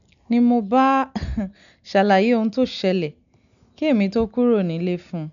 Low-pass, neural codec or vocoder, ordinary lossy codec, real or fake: 7.2 kHz; none; none; real